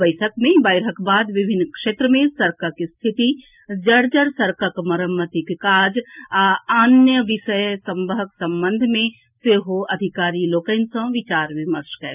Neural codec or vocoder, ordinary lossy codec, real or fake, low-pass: none; none; real; 3.6 kHz